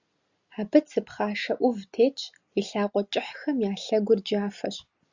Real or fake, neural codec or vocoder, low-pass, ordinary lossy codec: real; none; 7.2 kHz; Opus, 64 kbps